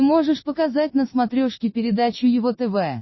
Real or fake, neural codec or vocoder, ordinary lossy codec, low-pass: real; none; MP3, 24 kbps; 7.2 kHz